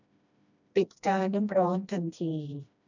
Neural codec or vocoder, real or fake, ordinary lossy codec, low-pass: codec, 16 kHz, 1 kbps, FreqCodec, smaller model; fake; none; 7.2 kHz